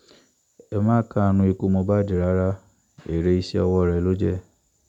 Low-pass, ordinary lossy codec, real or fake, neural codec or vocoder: 19.8 kHz; none; real; none